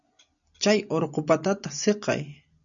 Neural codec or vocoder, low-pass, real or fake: none; 7.2 kHz; real